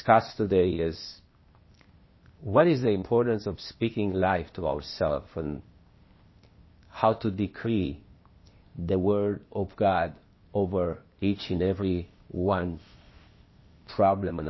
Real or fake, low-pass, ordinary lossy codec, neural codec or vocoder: fake; 7.2 kHz; MP3, 24 kbps; codec, 16 kHz, 0.8 kbps, ZipCodec